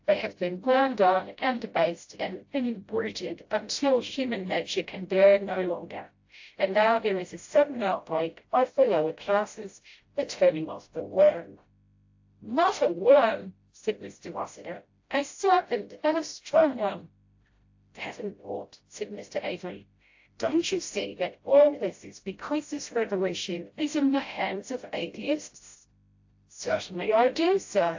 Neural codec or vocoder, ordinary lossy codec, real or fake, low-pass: codec, 16 kHz, 0.5 kbps, FreqCodec, smaller model; AAC, 48 kbps; fake; 7.2 kHz